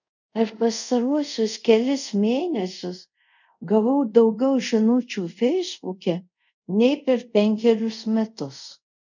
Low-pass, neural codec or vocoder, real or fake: 7.2 kHz; codec, 24 kHz, 0.5 kbps, DualCodec; fake